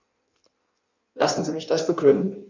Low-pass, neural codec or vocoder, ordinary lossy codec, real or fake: 7.2 kHz; codec, 16 kHz in and 24 kHz out, 1.1 kbps, FireRedTTS-2 codec; none; fake